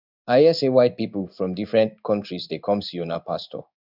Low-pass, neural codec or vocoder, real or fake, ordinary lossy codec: 5.4 kHz; codec, 16 kHz in and 24 kHz out, 1 kbps, XY-Tokenizer; fake; none